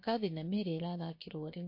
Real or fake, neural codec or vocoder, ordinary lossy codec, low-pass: fake; codec, 16 kHz, 0.7 kbps, FocalCodec; MP3, 32 kbps; 5.4 kHz